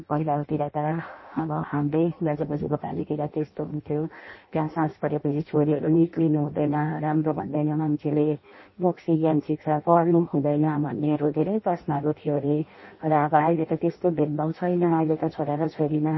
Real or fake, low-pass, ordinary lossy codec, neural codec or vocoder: fake; 7.2 kHz; MP3, 24 kbps; codec, 16 kHz in and 24 kHz out, 0.6 kbps, FireRedTTS-2 codec